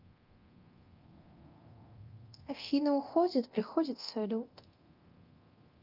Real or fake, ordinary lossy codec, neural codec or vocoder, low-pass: fake; Opus, 24 kbps; codec, 24 kHz, 0.9 kbps, DualCodec; 5.4 kHz